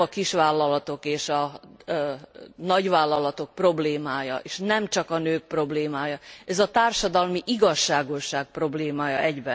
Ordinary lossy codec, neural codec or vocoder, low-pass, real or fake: none; none; none; real